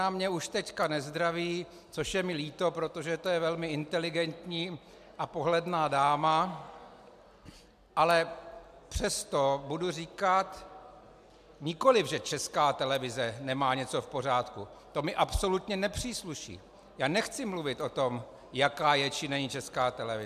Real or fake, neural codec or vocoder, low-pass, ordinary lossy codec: real; none; 14.4 kHz; AAC, 96 kbps